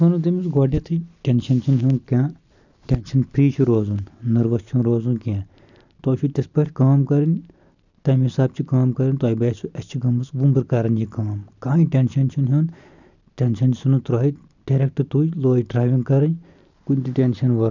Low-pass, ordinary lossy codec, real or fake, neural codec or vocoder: 7.2 kHz; none; real; none